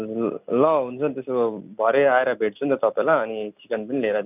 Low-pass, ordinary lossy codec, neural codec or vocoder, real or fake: 3.6 kHz; none; none; real